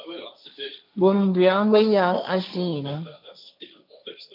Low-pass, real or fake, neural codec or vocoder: 5.4 kHz; fake; codec, 16 kHz, 1.1 kbps, Voila-Tokenizer